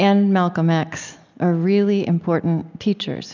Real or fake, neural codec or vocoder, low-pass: real; none; 7.2 kHz